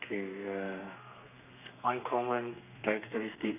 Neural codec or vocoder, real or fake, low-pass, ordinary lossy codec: codec, 32 kHz, 1.9 kbps, SNAC; fake; 3.6 kHz; none